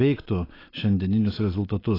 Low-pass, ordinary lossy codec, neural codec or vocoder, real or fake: 5.4 kHz; AAC, 24 kbps; none; real